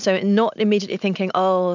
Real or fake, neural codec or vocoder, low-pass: real; none; 7.2 kHz